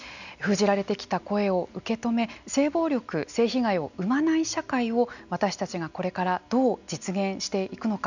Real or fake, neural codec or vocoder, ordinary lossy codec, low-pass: real; none; none; 7.2 kHz